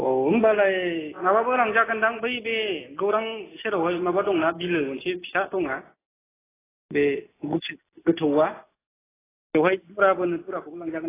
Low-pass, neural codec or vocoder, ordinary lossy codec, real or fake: 3.6 kHz; none; AAC, 16 kbps; real